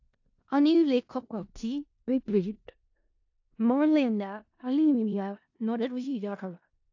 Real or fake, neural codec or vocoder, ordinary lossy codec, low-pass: fake; codec, 16 kHz in and 24 kHz out, 0.4 kbps, LongCat-Audio-Codec, four codebook decoder; AAC, 48 kbps; 7.2 kHz